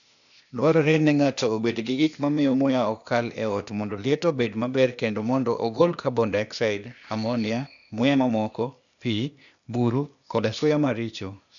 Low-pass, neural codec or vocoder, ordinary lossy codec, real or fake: 7.2 kHz; codec, 16 kHz, 0.8 kbps, ZipCodec; none; fake